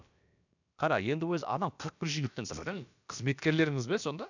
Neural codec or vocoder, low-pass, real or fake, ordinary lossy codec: codec, 16 kHz, about 1 kbps, DyCAST, with the encoder's durations; 7.2 kHz; fake; none